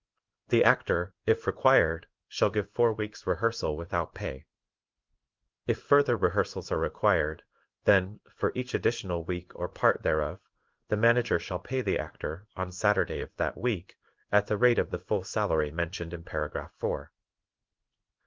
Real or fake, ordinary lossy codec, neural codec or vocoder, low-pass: fake; Opus, 32 kbps; codec, 16 kHz in and 24 kHz out, 1 kbps, XY-Tokenizer; 7.2 kHz